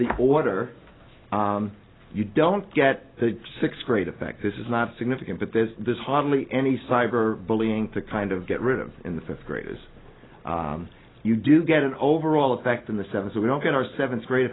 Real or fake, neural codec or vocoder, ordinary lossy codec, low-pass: real; none; AAC, 16 kbps; 7.2 kHz